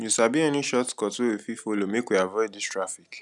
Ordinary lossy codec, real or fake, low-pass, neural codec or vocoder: none; real; 10.8 kHz; none